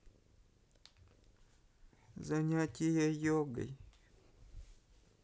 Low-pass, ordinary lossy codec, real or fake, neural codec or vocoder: none; none; real; none